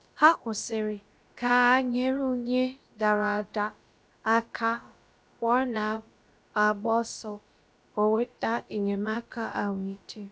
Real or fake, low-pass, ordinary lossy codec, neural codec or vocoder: fake; none; none; codec, 16 kHz, about 1 kbps, DyCAST, with the encoder's durations